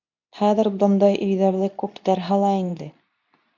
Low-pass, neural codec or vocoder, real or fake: 7.2 kHz; codec, 24 kHz, 0.9 kbps, WavTokenizer, medium speech release version 2; fake